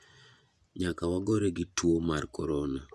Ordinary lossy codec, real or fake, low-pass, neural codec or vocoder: none; fake; none; vocoder, 24 kHz, 100 mel bands, Vocos